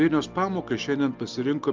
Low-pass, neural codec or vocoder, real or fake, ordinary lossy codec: 7.2 kHz; none; real; Opus, 24 kbps